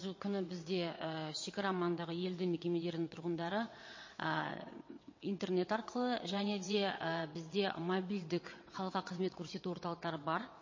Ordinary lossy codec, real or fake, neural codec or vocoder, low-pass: MP3, 32 kbps; fake; vocoder, 22.05 kHz, 80 mel bands, WaveNeXt; 7.2 kHz